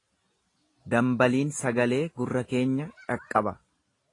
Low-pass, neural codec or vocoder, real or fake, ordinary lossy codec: 10.8 kHz; none; real; AAC, 32 kbps